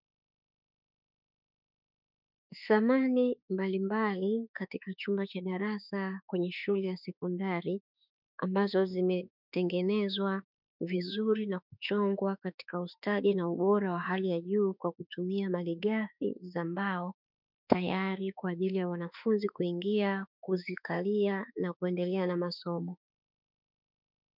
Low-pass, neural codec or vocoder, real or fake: 5.4 kHz; autoencoder, 48 kHz, 32 numbers a frame, DAC-VAE, trained on Japanese speech; fake